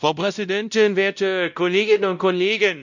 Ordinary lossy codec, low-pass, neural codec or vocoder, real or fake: none; 7.2 kHz; codec, 16 kHz, 0.5 kbps, X-Codec, WavLM features, trained on Multilingual LibriSpeech; fake